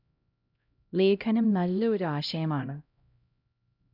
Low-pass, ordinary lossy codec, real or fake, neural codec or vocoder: 5.4 kHz; none; fake; codec, 16 kHz, 0.5 kbps, X-Codec, HuBERT features, trained on LibriSpeech